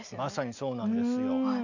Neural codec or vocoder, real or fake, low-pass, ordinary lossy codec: none; real; 7.2 kHz; none